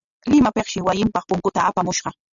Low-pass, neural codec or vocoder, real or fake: 7.2 kHz; none; real